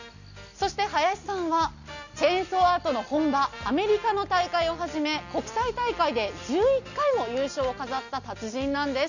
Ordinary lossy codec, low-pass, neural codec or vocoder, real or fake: none; 7.2 kHz; none; real